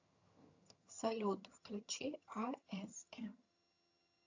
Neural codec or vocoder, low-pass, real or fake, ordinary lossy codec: vocoder, 22.05 kHz, 80 mel bands, HiFi-GAN; 7.2 kHz; fake; AAC, 48 kbps